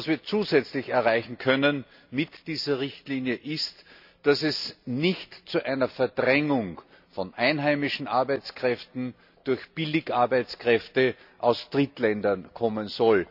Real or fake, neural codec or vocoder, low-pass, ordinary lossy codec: real; none; 5.4 kHz; none